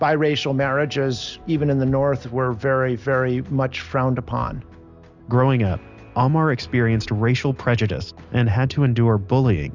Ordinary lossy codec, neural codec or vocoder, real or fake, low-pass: Opus, 64 kbps; none; real; 7.2 kHz